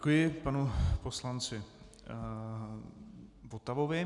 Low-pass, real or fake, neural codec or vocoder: 10.8 kHz; real; none